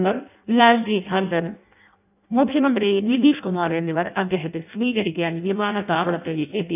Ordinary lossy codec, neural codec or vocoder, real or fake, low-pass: none; codec, 16 kHz in and 24 kHz out, 0.6 kbps, FireRedTTS-2 codec; fake; 3.6 kHz